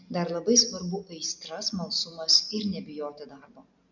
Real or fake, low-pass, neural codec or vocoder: real; 7.2 kHz; none